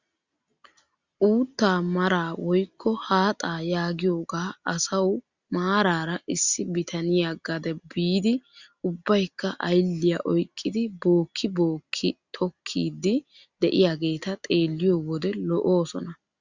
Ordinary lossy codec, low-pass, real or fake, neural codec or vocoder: Opus, 64 kbps; 7.2 kHz; real; none